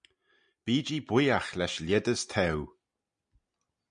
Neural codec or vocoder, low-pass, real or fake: none; 9.9 kHz; real